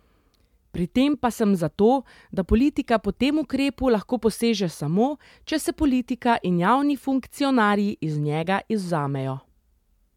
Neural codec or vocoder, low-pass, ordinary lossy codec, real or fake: none; 19.8 kHz; MP3, 96 kbps; real